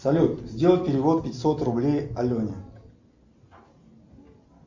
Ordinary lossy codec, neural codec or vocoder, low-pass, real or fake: MP3, 64 kbps; none; 7.2 kHz; real